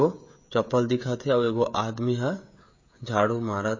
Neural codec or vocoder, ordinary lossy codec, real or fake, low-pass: codec, 16 kHz, 16 kbps, FreqCodec, smaller model; MP3, 32 kbps; fake; 7.2 kHz